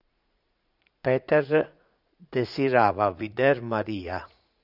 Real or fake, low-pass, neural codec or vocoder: fake; 5.4 kHz; vocoder, 44.1 kHz, 80 mel bands, Vocos